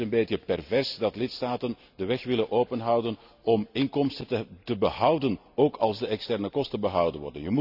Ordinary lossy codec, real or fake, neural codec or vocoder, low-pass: none; real; none; 5.4 kHz